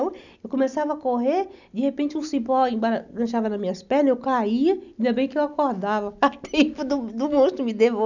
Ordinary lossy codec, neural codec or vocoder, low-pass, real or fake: none; none; 7.2 kHz; real